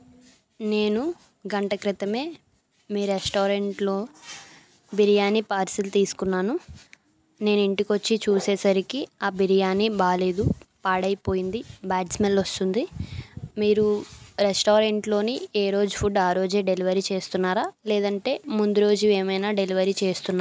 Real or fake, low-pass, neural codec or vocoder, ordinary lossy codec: real; none; none; none